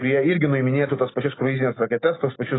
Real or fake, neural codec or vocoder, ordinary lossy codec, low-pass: real; none; AAC, 16 kbps; 7.2 kHz